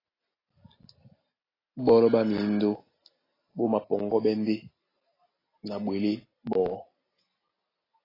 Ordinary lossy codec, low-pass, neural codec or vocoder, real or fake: AAC, 24 kbps; 5.4 kHz; none; real